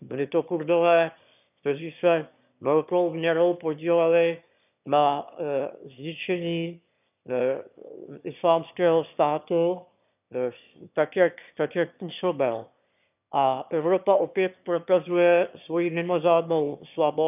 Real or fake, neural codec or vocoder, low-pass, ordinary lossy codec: fake; autoencoder, 22.05 kHz, a latent of 192 numbers a frame, VITS, trained on one speaker; 3.6 kHz; none